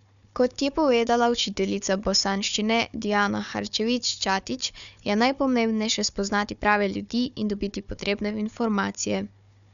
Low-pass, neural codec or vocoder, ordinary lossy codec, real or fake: 7.2 kHz; codec, 16 kHz, 4 kbps, FunCodec, trained on Chinese and English, 50 frames a second; none; fake